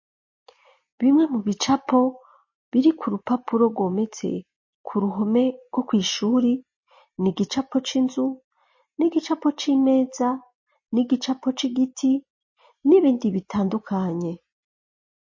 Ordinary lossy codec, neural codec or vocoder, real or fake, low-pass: MP3, 32 kbps; none; real; 7.2 kHz